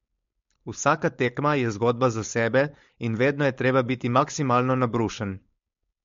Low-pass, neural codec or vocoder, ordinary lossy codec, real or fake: 7.2 kHz; codec, 16 kHz, 4.8 kbps, FACodec; MP3, 48 kbps; fake